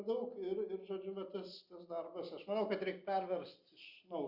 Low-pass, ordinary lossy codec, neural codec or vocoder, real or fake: 5.4 kHz; Opus, 64 kbps; none; real